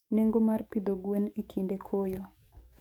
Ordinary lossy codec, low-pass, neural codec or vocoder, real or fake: none; 19.8 kHz; codec, 44.1 kHz, 7.8 kbps, DAC; fake